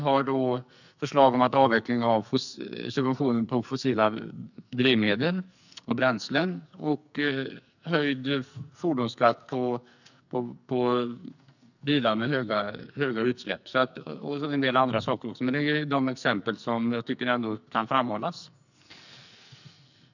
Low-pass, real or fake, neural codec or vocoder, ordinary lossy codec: 7.2 kHz; fake; codec, 44.1 kHz, 2.6 kbps, SNAC; none